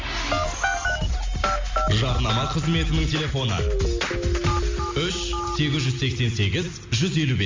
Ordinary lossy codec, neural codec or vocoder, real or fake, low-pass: MP3, 48 kbps; none; real; 7.2 kHz